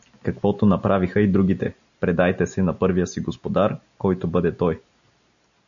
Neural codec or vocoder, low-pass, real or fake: none; 7.2 kHz; real